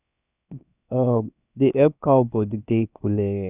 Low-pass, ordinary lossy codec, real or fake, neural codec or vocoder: 3.6 kHz; none; fake; codec, 16 kHz, 0.7 kbps, FocalCodec